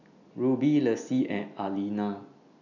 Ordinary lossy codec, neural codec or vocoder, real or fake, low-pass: none; none; real; 7.2 kHz